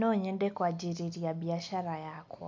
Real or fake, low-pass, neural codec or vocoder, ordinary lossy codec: real; none; none; none